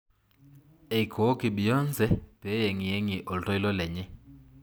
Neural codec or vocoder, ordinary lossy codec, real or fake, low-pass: none; none; real; none